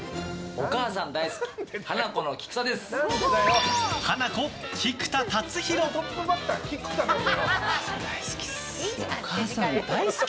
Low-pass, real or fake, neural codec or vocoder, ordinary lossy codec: none; real; none; none